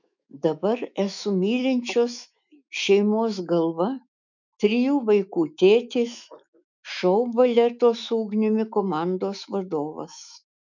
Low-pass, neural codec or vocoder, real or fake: 7.2 kHz; autoencoder, 48 kHz, 128 numbers a frame, DAC-VAE, trained on Japanese speech; fake